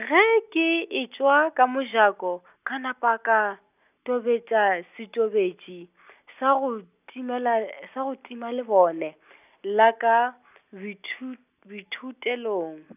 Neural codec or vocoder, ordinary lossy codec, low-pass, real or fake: none; none; 3.6 kHz; real